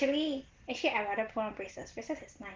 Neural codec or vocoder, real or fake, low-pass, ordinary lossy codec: none; real; 7.2 kHz; Opus, 16 kbps